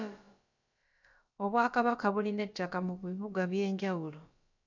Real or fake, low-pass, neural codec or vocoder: fake; 7.2 kHz; codec, 16 kHz, about 1 kbps, DyCAST, with the encoder's durations